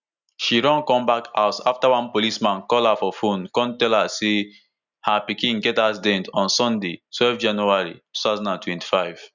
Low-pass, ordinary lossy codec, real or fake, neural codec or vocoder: 7.2 kHz; none; real; none